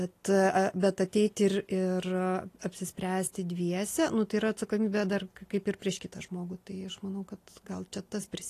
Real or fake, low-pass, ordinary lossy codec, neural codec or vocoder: real; 14.4 kHz; AAC, 48 kbps; none